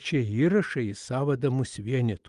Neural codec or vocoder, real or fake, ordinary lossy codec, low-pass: none; real; Opus, 32 kbps; 10.8 kHz